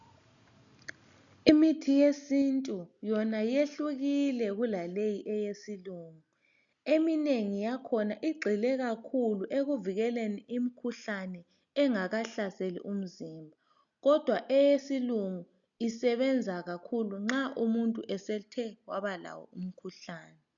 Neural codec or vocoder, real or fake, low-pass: none; real; 7.2 kHz